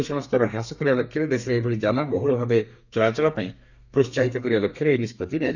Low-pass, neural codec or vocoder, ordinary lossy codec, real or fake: 7.2 kHz; codec, 32 kHz, 1.9 kbps, SNAC; none; fake